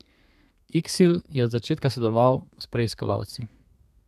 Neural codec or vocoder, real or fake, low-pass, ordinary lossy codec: codec, 32 kHz, 1.9 kbps, SNAC; fake; 14.4 kHz; none